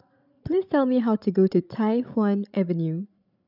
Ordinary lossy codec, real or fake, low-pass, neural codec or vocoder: none; fake; 5.4 kHz; codec, 16 kHz, 16 kbps, FreqCodec, larger model